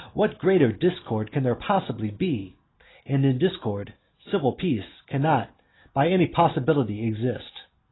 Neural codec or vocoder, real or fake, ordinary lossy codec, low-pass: none; real; AAC, 16 kbps; 7.2 kHz